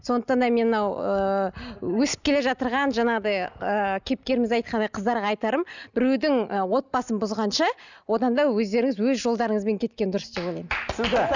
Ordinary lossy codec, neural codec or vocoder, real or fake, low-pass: none; none; real; 7.2 kHz